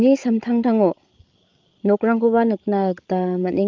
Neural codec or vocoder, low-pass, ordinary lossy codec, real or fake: codec, 16 kHz, 8 kbps, FreqCodec, larger model; 7.2 kHz; Opus, 32 kbps; fake